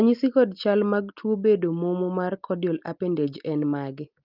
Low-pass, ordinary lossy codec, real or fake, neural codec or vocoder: 5.4 kHz; Opus, 32 kbps; real; none